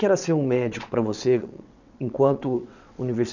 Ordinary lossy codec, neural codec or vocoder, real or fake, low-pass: none; vocoder, 22.05 kHz, 80 mel bands, WaveNeXt; fake; 7.2 kHz